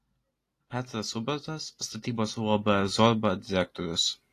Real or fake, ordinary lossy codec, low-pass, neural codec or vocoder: real; AAC, 48 kbps; 14.4 kHz; none